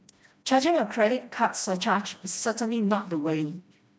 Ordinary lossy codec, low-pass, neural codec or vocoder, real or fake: none; none; codec, 16 kHz, 1 kbps, FreqCodec, smaller model; fake